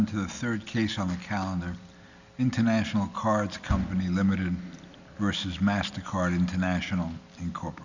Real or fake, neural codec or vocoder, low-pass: real; none; 7.2 kHz